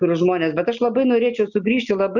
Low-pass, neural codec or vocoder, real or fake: 7.2 kHz; none; real